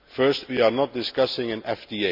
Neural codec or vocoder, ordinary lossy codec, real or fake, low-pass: none; MP3, 48 kbps; real; 5.4 kHz